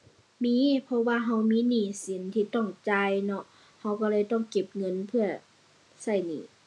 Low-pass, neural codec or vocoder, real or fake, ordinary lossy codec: none; none; real; none